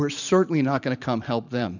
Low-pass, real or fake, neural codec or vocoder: 7.2 kHz; fake; vocoder, 44.1 kHz, 128 mel bands every 512 samples, BigVGAN v2